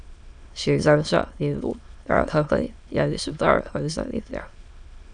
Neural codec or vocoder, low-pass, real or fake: autoencoder, 22.05 kHz, a latent of 192 numbers a frame, VITS, trained on many speakers; 9.9 kHz; fake